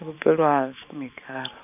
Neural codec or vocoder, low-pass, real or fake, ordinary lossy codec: vocoder, 44.1 kHz, 128 mel bands every 512 samples, BigVGAN v2; 3.6 kHz; fake; none